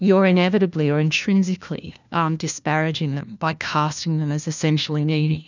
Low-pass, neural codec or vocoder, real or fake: 7.2 kHz; codec, 16 kHz, 1 kbps, FunCodec, trained on LibriTTS, 50 frames a second; fake